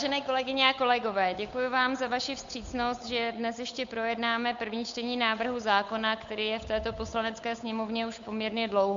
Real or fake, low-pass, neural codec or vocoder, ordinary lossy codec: fake; 7.2 kHz; codec, 16 kHz, 8 kbps, FunCodec, trained on Chinese and English, 25 frames a second; MP3, 64 kbps